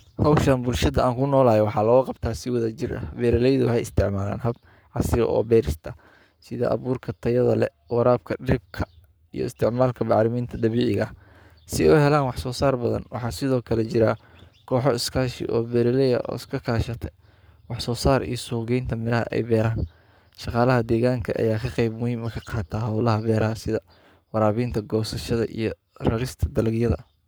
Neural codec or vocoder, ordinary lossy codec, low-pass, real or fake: codec, 44.1 kHz, 7.8 kbps, Pupu-Codec; none; none; fake